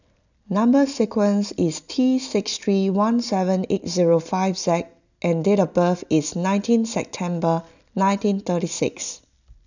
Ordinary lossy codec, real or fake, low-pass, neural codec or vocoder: none; real; 7.2 kHz; none